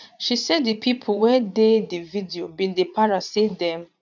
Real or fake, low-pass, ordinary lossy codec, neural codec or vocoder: fake; 7.2 kHz; none; vocoder, 44.1 kHz, 80 mel bands, Vocos